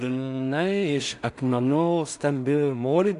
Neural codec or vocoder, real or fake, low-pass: codec, 16 kHz in and 24 kHz out, 0.4 kbps, LongCat-Audio-Codec, two codebook decoder; fake; 10.8 kHz